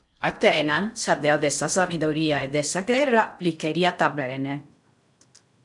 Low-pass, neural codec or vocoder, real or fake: 10.8 kHz; codec, 16 kHz in and 24 kHz out, 0.6 kbps, FocalCodec, streaming, 4096 codes; fake